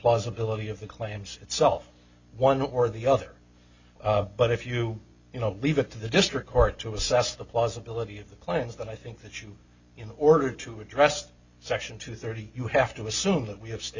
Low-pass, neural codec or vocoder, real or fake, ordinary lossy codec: 7.2 kHz; none; real; Opus, 64 kbps